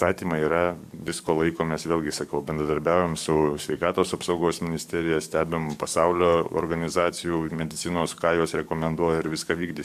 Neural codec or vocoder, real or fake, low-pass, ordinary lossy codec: codec, 44.1 kHz, 7.8 kbps, DAC; fake; 14.4 kHz; MP3, 96 kbps